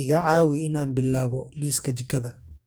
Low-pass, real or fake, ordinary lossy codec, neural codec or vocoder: none; fake; none; codec, 44.1 kHz, 2.6 kbps, DAC